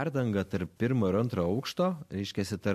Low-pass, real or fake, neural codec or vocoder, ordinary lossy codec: 14.4 kHz; real; none; MP3, 64 kbps